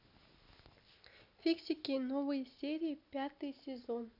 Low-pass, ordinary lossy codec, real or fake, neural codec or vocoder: 5.4 kHz; none; real; none